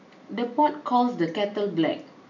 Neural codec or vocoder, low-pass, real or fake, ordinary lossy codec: none; 7.2 kHz; real; none